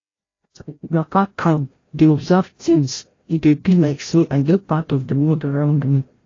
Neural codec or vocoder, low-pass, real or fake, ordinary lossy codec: codec, 16 kHz, 0.5 kbps, FreqCodec, larger model; 7.2 kHz; fake; AAC, 32 kbps